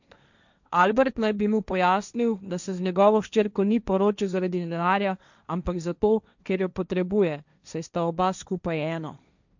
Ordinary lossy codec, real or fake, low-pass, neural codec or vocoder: none; fake; 7.2 kHz; codec, 16 kHz, 1.1 kbps, Voila-Tokenizer